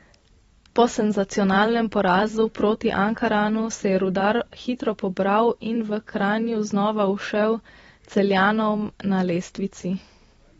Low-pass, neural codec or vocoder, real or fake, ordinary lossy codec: 19.8 kHz; vocoder, 44.1 kHz, 128 mel bands every 256 samples, BigVGAN v2; fake; AAC, 24 kbps